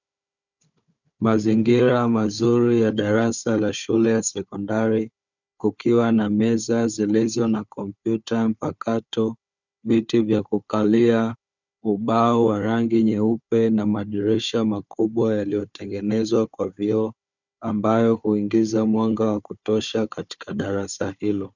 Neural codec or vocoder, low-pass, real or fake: codec, 16 kHz, 4 kbps, FunCodec, trained on Chinese and English, 50 frames a second; 7.2 kHz; fake